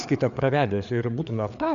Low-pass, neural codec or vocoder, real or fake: 7.2 kHz; codec, 16 kHz, 4 kbps, FreqCodec, larger model; fake